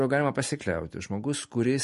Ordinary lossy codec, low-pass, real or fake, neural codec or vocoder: MP3, 48 kbps; 14.4 kHz; real; none